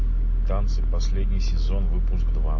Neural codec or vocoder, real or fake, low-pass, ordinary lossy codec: none; real; 7.2 kHz; MP3, 32 kbps